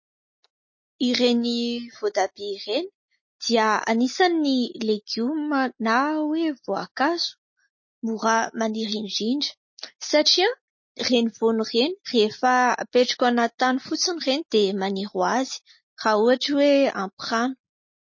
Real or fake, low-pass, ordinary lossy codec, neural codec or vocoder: real; 7.2 kHz; MP3, 32 kbps; none